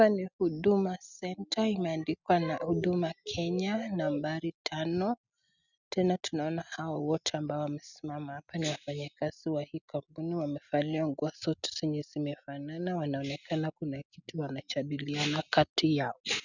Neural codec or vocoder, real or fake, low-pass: none; real; 7.2 kHz